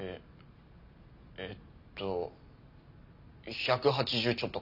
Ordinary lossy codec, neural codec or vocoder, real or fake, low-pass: none; none; real; 5.4 kHz